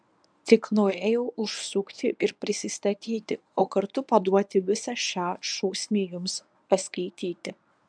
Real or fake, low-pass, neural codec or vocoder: fake; 9.9 kHz; codec, 24 kHz, 0.9 kbps, WavTokenizer, medium speech release version 1